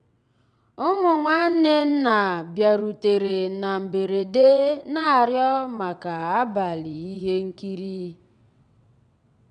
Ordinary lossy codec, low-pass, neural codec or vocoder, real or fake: none; 9.9 kHz; vocoder, 22.05 kHz, 80 mel bands, WaveNeXt; fake